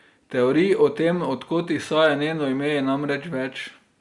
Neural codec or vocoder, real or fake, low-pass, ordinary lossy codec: none; real; 10.8 kHz; Opus, 64 kbps